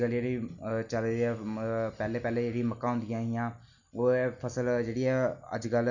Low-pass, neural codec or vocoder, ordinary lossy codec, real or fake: 7.2 kHz; none; none; real